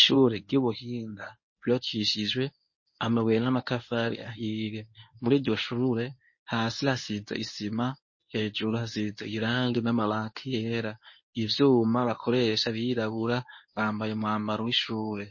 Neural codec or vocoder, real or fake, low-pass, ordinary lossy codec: codec, 24 kHz, 0.9 kbps, WavTokenizer, medium speech release version 1; fake; 7.2 kHz; MP3, 32 kbps